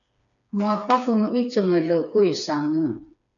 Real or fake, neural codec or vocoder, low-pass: fake; codec, 16 kHz, 4 kbps, FreqCodec, smaller model; 7.2 kHz